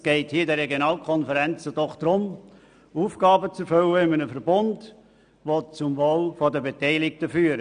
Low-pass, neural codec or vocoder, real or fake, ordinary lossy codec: 9.9 kHz; none; real; none